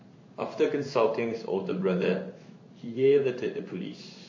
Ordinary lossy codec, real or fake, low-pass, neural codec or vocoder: MP3, 32 kbps; fake; 7.2 kHz; codec, 16 kHz in and 24 kHz out, 1 kbps, XY-Tokenizer